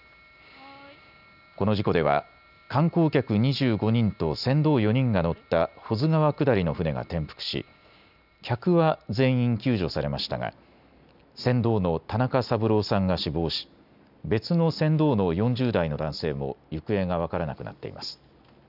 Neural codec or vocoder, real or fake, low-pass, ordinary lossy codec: none; real; 5.4 kHz; none